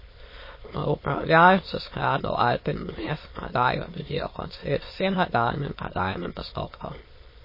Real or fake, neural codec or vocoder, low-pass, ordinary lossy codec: fake; autoencoder, 22.05 kHz, a latent of 192 numbers a frame, VITS, trained on many speakers; 5.4 kHz; MP3, 24 kbps